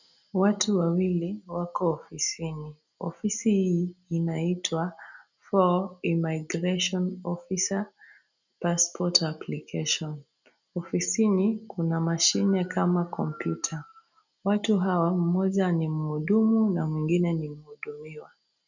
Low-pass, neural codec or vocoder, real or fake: 7.2 kHz; none; real